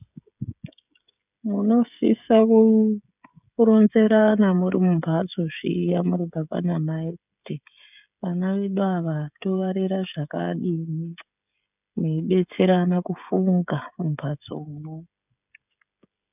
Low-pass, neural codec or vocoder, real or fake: 3.6 kHz; codec, 16 kHz, 16 kbps, FreqCodec, smaller model; fake